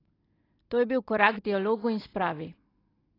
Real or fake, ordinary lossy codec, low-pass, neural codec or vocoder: real; AAC, 24 kbps; 5.4 kHz; none